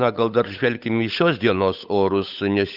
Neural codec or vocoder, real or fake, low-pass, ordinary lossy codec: codec, 16 kHz, 8 kbps, FunCodec, trained on Chinese and English, 25 frames a second; fake; 5.4 kHz; Opus, 64 kbps